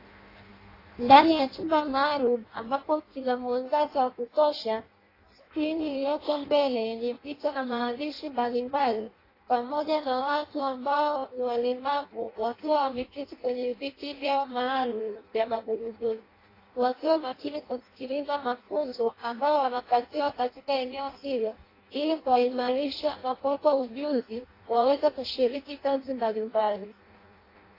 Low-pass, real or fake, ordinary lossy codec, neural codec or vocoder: 5.4 kHz; fake; AAC, 24 kbps; codec, 16 kHz in and 24 kHz out, 0.6 kbps, FireRedTTS-2 codec